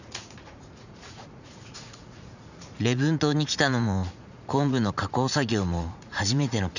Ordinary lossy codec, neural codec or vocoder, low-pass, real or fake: none; vocoder, 44.1 kHz, 80 mel bands, Vocos; 7.2 kHz; fake